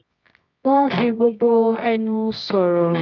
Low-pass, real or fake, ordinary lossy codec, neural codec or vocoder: 7.2 kHz; fake; none; codec, 24 kHz, 0.9 kbps, WavTokenizer, medium music audio release